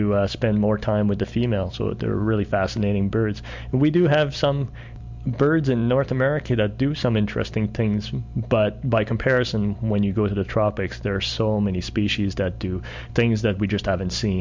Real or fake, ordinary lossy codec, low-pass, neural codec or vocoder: real; MP3, 64 kbps; 7.2 kHz; none